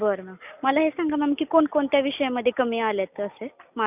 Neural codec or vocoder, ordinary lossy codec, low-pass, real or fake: none; none; 3.6 kHz; real